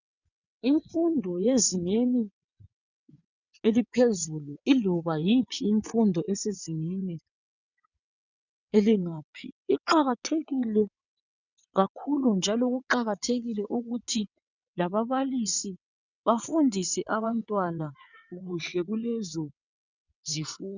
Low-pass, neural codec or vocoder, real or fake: 7.2 kHz; vocoder, 22.05 kHz, 80 mel bands, WaveNeXt; fake